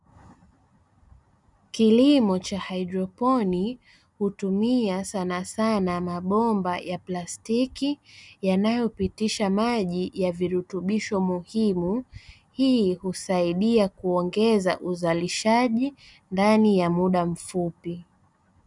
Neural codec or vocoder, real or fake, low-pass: none; real; 10.8 kHz